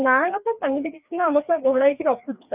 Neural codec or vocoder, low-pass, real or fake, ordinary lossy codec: codec, 16 kHz, 2 kbps, FreqCodec, larger model; 3.6 kHz; fake; none